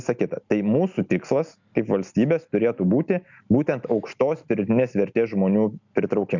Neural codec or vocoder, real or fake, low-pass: none; real; 7.2 kHz